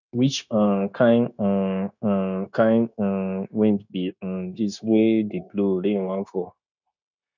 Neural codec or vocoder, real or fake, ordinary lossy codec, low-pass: codec, 16 kHz, 0.9 kbps, LongCat-Audio-Codec; fake; none; 7.2 kHz